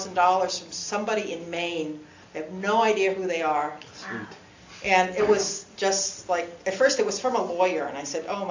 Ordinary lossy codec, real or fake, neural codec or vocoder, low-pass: AAC, 48 kbps; real; none; 7.2 kHz